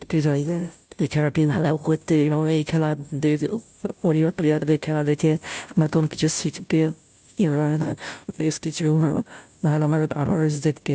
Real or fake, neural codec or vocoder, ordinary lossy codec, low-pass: fake; codec, 16 kHz, 0.5 kbps, FunCodec, trained on Chinese and English, 25 frames a second; none; none